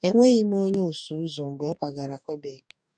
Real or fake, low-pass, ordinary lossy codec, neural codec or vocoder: fake; 9.9 kHz; none; codec, 44.1 kHz, 2.6 kbps, DAC